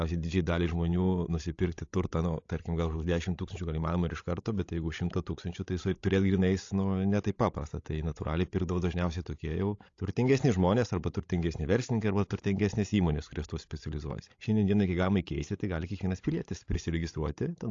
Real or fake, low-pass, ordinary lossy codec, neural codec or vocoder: fake; 7.2 kHz; AAC, 48 kbps; codec, 16 kHz, 16 kbps, FreqCodec, larger model